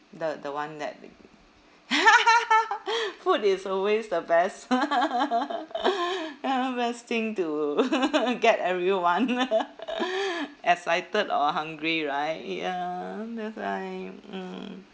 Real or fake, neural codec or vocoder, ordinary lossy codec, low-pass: real; none; none; none